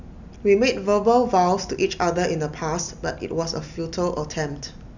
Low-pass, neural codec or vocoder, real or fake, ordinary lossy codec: 7.2 kHz; none; real; none